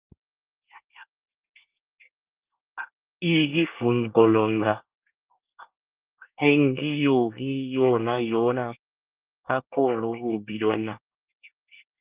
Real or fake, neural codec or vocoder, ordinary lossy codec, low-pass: fake; codec, 24 kHz, 1 kbps, SNAC; Opus, 32 kbps; 3.6 kHz